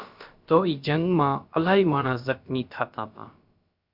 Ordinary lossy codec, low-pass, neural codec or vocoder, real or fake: Opus, 64 kbps; 5.4 kHz; codec, 16 kHz, about 1 kbps, DyCAST, with the encoder's durations; fake